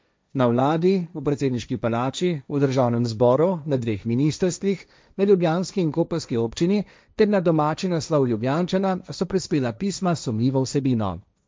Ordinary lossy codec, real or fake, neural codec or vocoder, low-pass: none; fake; codec, 16 kHz, 1.1 kbps, Voila-Tokenizer; none